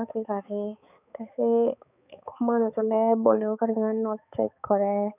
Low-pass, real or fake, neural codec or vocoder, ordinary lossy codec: 3.6 kHz; fake; codec, 16 kHz, 4 kbps, X-Codec, HuBERT features, trained on balanced general audio; none